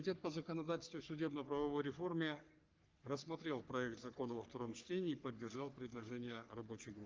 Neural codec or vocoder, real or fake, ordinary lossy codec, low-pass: codec, 44.1 kHz, 3.4 kbps, Pupu-Codec; fake; Opus, 32 kbps; 7.2 kHz